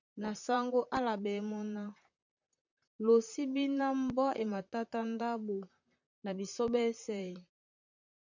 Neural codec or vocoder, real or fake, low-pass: vocoder, 44.1 kHz, 128 mel bands, Pupu-Vocoder; fake; 7.2 kHz